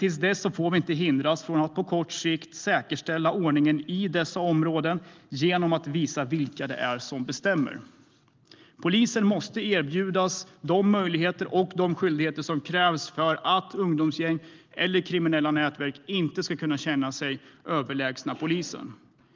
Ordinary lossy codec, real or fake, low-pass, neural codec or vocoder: Opus, 32 kbps; real; 7.2 kHz; none